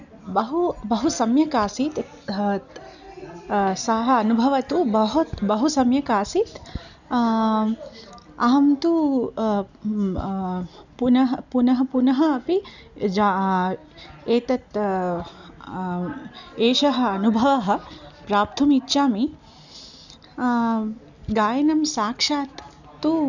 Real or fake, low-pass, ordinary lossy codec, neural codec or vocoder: real; 7.2 kHz; none; none